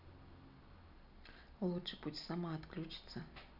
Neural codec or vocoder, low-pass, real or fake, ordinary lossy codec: none; 5.4 kHz; real; none